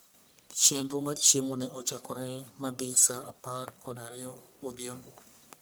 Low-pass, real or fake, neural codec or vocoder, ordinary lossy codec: none; fake; codec, 44.1 kHz, 1.7 kbps, Pupu-Codec; none